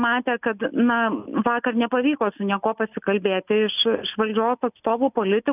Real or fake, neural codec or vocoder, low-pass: real; none; 3.6 kHz